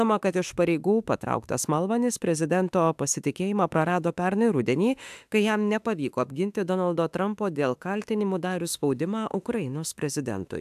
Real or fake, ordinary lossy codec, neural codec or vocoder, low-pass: fake; AAC, 96 kbps; autoencoder, 48 kHz, 32 numbers a frame, DAC-VAE, trained on Japanese speech; 14.4 kHz